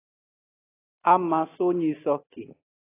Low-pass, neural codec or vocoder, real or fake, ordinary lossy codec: 3.6 kHz; none; real; AAC, 16 kbps